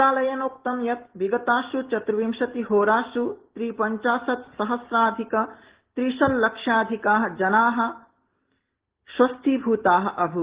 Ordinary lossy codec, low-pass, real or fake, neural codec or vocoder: Opus, 16 kbps; 3.6 kHz; real; none